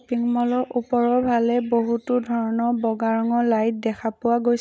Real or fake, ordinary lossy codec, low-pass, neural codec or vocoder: real; none; none; none